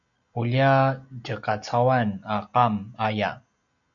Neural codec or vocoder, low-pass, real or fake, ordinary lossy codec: none; 7.2 kHz; real; MP3, 64 kbps